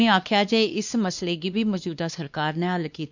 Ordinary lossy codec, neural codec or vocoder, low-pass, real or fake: none; codec, 16 kHz, 2 kbps, X-Codec, WavLM features, trained on Multilingual LibriSpeech; 7.2 kHz; fake